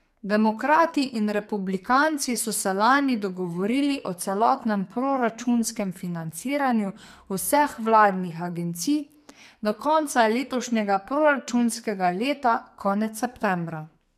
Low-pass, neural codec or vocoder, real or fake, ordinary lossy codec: 14.4 kHz; codec, 44.1 kHz, 2.6 kbps, SNAC; fake; MP3, 96 kbps